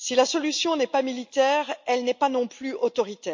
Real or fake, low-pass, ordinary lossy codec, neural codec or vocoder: real; 7.2 kHz; MP3, 48 kbps; none